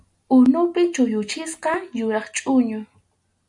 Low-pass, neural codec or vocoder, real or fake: 10.8 kHz; none; real